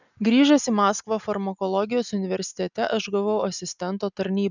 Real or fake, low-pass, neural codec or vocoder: real; 7.2 kHz; none